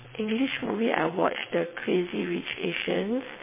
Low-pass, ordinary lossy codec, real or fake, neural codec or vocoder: 3.6 kHz; MP3, 16 kbps; fake; vocoder, 22.05 kHz, 80 mel bands, WaveNeXt